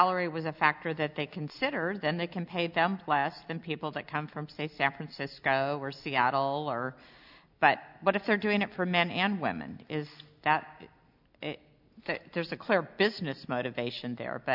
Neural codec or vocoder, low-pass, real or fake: none; 5.4 kHz; real